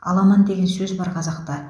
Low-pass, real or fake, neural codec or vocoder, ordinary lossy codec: none; real; none; none